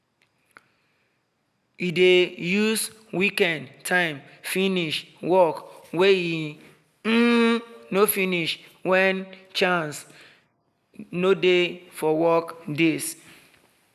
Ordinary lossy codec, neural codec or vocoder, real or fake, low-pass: none; none; real; 14.4 kHz